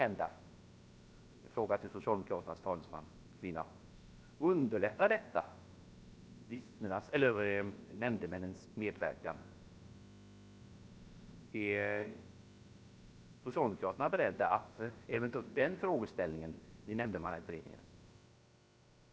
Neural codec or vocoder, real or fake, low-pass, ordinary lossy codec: codec, 16 kHz, about 1 kbps, DyCAST, with the encoder's durations; fake; none; none